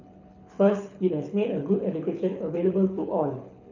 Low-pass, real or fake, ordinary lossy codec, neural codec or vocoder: 7.2 kHz; fake; none; codec, 24 kHz, 6 kbps, HILCodec